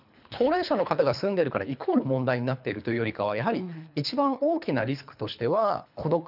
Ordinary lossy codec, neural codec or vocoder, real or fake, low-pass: none; codec, 24 kHz, 3 kbps, HILCodec; fake; 5.4 kHz